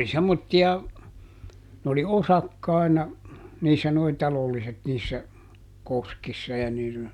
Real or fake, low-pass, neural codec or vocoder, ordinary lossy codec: real; 19.8 kHz; none; none